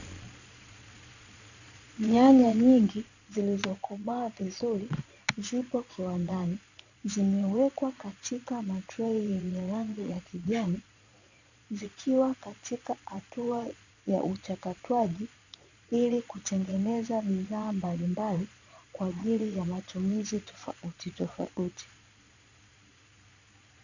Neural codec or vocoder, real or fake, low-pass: vocoder, 22.05 kHz, 80 mel bands, Vocos; fake; 7.2 kHz